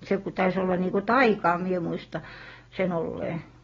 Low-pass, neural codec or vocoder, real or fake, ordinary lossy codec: 7.2 kHz; none; real; AAC, 24 kbps